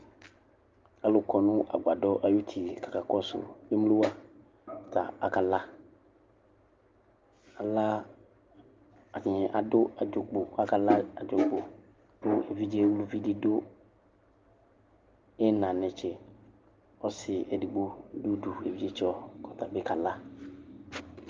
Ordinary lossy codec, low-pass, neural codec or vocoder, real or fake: Opus, 32 kbps; 7.2 kHz; none; real